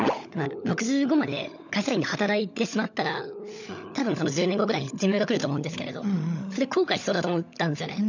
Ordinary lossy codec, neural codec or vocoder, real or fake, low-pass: none; codec, 16 kHz, 16 kbps, FunCodec, trained on LibriTTS, 50 frames a second; fake; 7.2 kHz